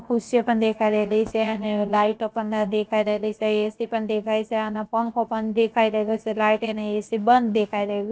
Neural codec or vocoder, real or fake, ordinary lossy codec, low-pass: codec, 16 kHz, about 1 kbps, DyCAST, with the encoder's durations; fake; none; none